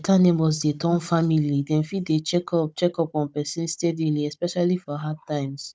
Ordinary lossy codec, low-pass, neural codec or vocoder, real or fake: none; none; codec, 16 kHz, 16 kbps, FunCodec, trained on Chinese and English, 50 frames a second; fake